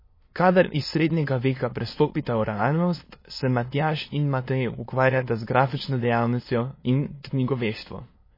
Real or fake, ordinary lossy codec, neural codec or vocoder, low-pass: fake; MP3, 24 kbps; autoencoder, 22.05 kHz, a latent of 192 numbers a frame, VITS, trained on many speakers; 5.4 kHz